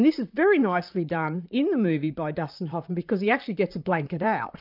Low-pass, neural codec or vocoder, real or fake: 5.4 kHz; vocoder, 44.1 kHz, 128 mel bands, Pupu-Vocoder; fake